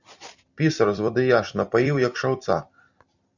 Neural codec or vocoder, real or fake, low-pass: vocoder, 44.1 kHz, 128 mel bands every 256 samples, BigVGAN v2; fake; 7.2 kHz